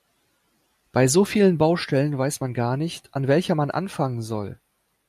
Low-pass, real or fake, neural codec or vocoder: 14.4 kHz; real; none